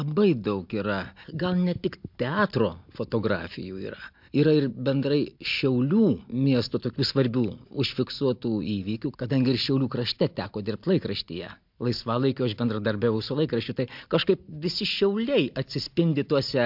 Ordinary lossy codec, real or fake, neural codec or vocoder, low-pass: MP3, 48 kbps; real; none; 5.4 kHz